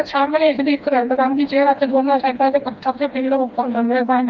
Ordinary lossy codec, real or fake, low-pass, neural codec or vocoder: Opus, 24 kbps; fake; 7.2 kHz; codec, 16 kHz, 1 kbps, FreqCodec, smaller model